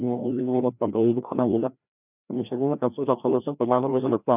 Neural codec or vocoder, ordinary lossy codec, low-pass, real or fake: codec, 16 kHz, 1 kbps, FreqCodec, larger model; none; 3.6 kHz; fake